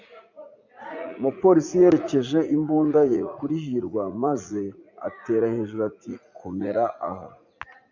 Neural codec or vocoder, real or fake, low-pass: vocoder, 44.1 kHz, 80 mel bands, Vocos; fake; 7.2 kHz